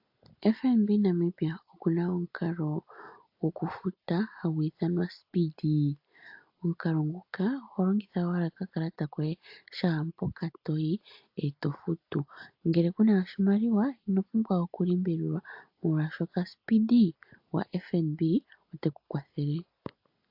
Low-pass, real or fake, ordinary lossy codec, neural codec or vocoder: 5.4 kHz; real; AAC, 48 kbps; none